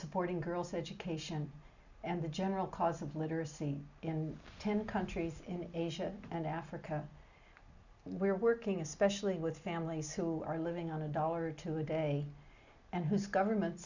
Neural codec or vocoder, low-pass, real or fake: none; 7.2 kHz; real